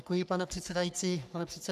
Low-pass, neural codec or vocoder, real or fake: 14.4 kHz; codec, 44.1 kHz, 3.4 kbps, Pupu-Codec; fake